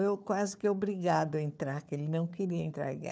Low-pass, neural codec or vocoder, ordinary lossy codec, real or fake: none; codec, 16 kHz, 4 kbps, FunCodec, trained on Chinese and English, 50 frames a second; none; fake